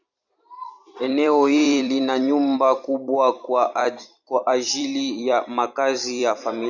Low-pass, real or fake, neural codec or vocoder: 7.2 kHz; fake; vocoder, 44.1 kHz, 128 mel bands every 256 samples, BigVGAN v2